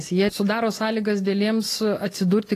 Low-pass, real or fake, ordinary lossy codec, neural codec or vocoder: 14.4 kHz; real; AAC, 48 kbps; none